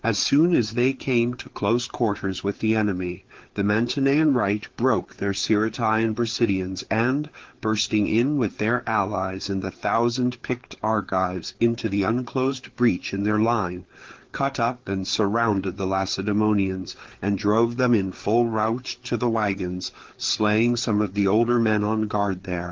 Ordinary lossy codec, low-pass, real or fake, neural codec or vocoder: Opus, 16 kbps; 7.2 kHz; fake; codec, 16 kHz, 4 kbps, FreqCodec, larger model